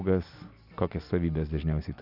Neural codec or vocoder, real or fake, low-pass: none; real; 5.4 kHz